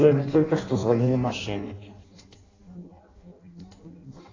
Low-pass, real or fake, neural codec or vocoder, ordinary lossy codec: 7.2 kHz; fake; codec, 16 kHz in and 24 kHz out, 0.6 kbps, FireRedTTS-2 codec; AAC, 32 kbps